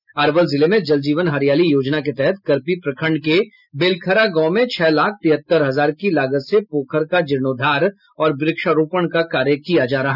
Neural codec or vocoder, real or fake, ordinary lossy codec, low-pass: none; real; none; 5.4 kHz